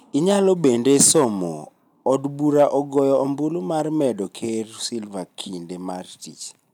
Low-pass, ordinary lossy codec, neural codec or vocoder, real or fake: 19.8 kHz; none; none; real